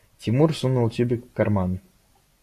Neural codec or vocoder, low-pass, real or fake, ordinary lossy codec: none; 14.4 kHz; real; AAC, 64 kbps